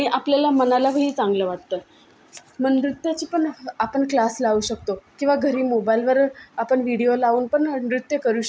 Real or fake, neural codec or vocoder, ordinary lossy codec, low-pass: real; none; none; none